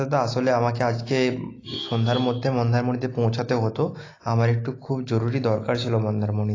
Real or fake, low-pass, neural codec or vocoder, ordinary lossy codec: real; 7.2 kHz; none; AAC, 32 kbps